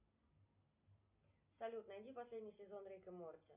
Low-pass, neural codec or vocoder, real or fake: 3.6 kHz; none; real